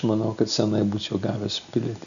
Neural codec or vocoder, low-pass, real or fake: none; 7.2 kHz; real